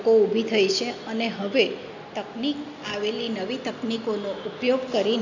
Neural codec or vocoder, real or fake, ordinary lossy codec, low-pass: none; real; none; 7.2 kHz